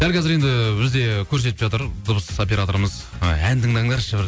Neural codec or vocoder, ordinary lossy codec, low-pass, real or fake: none; none; none; real